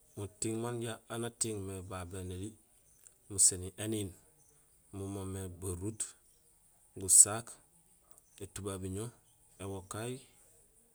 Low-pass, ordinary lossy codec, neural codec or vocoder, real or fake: none; none; none; real